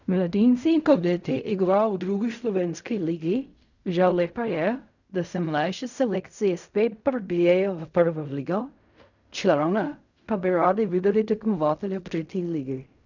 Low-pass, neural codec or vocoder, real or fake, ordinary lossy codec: 7.2 kHz; codec, 16 kHz in and 24 kHz out, 0.4 kbps, LongCat-Audio-Codec, fine tuned four codebook decoder; fake; none